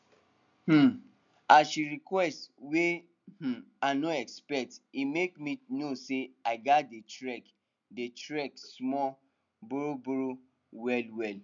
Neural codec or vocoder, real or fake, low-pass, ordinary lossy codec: none; real; 7.2 kHz; none